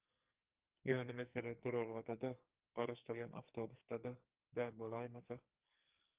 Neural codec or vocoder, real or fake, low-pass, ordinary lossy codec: codec, 44.1 kHz, 2.6 kbps, SNAC; fake; 3.6 kHz; Opus, 32 kbps